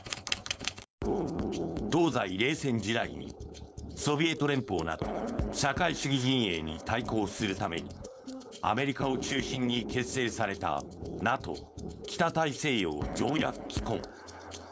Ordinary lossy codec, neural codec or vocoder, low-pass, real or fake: none; codec, 16 kHz, 4.8 kbps, FACodec; none; fake